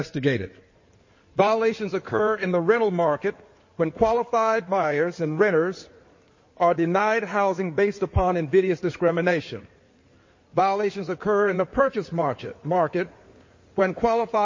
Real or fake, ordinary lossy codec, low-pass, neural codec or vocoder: fake; MP3, 32 kbps; 7.2 kHz; codec, 16 kHz in and 24 kHz out, 2.2 kbps, FireRedTTS-2 codec